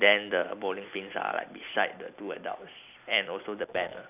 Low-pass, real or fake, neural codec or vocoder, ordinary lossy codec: 3.6 kHz; real; none; none